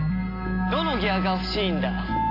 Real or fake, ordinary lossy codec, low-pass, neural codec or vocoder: real; AAC, 32 kbps; 5.4 kHz; none